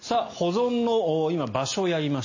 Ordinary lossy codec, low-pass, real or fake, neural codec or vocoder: MP3, 32 kbps; 7.2 kHz; real; none